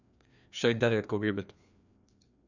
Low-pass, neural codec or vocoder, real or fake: 7.2 kHz; codec, 16 kHz, 2 kbps, FreqCodec, larger model; fake